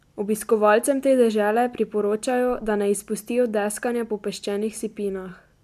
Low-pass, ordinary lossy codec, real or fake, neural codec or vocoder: 14.4 kHz; MP3, 96 kbps; real; none